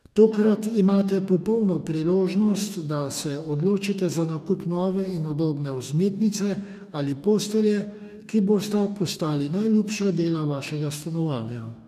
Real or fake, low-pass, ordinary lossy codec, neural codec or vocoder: fake; 14.4 kHz; none; codec, 44.1 kHz, 2.6 kbps, DAC